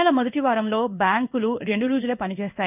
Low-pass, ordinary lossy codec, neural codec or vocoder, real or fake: 3.6 kHz; none; codec, 16 kHz in and 24 kHz out, 1 kbps, XY-Tokenizer; fake